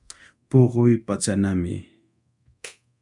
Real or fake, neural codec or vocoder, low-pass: fake; codec, 24 kHz, 0.9 kbps, DualCodec; 10.8 kHz